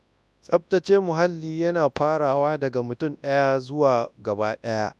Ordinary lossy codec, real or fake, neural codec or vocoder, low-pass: none; fake; codec, 24 kHz, 0.9 kbps, WavTokenizer, large speech release; none